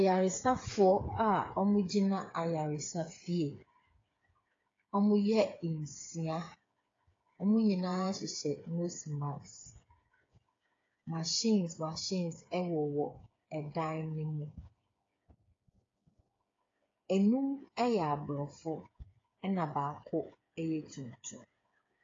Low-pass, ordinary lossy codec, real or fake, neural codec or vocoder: 7.2 kHz; AAC, 32 kbps; fake; codec, 16 kHz, 8 kbps, FreqCodec, smaller model